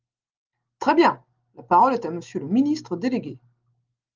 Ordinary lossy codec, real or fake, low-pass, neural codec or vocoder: Opus, 32 kbps; real; 7.2 kHz; none